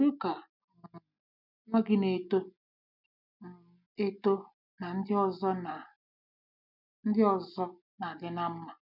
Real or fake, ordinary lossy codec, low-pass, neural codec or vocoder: real; none; 5.4 kHz; none